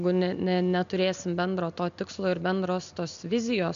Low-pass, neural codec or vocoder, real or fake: 7.2 kHz; none; real